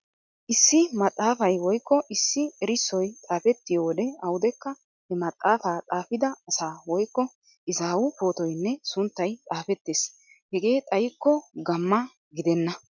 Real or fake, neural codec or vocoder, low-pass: real; none; 7.2 kHz